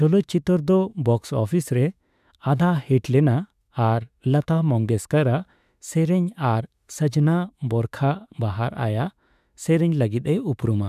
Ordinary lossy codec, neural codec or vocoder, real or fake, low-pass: none; autoencoder, 48 kHz, 32 numbers a frame, DAC-VAE, trained on Japanese speech; fake; 14.4 kHz